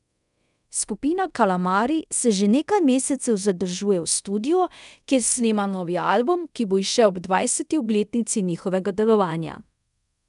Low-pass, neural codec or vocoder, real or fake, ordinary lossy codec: 10.8 kHz; codec, 24 kHz, 0.5 kbps, DualCodec; fake; none